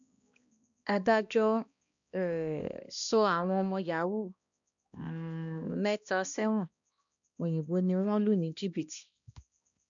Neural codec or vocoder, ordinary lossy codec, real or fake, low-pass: codec, 16 kHz, 1 kbps, X-Codec, HuBERT features, trained on balanced general audio; none; fake; 7.2 kHz